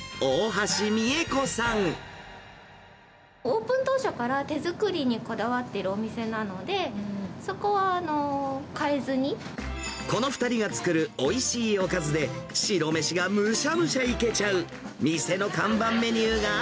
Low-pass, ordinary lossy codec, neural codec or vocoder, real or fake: none; none; none; real